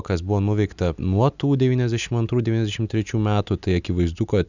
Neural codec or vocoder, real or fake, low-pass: none; real; 7.2 kHz